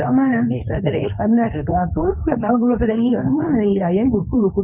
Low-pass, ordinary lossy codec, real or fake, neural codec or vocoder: 3.6 kHz; none; fake; codec, 24 kHz, 0.9 kbps, WavTokenizer, medium speech release version 2